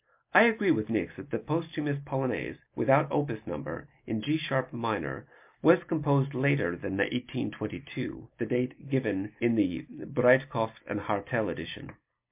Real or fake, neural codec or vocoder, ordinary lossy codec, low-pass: real; none; MP3, 32 kbps; 3.6 kHz